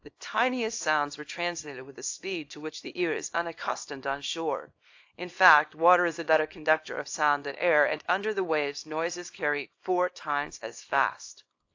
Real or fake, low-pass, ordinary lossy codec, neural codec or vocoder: fake; 7.2 kHz; AAC, 48 kbps; codec, 24 kHz, 0.9 kbps, WavTokenizer, small release